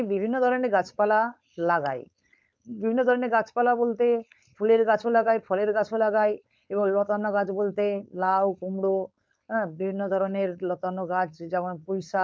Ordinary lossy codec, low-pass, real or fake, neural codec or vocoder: none; none; fake; codec, 16 kHz, 4.8 kbps, FACodec